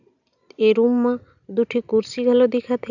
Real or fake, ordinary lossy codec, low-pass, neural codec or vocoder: real; none; 7.2 kHz; none